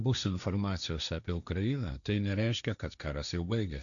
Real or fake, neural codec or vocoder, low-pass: fake; codec, 16 kHz, 1.1 kbps, Voila-Tokenizer; 7.2 kHz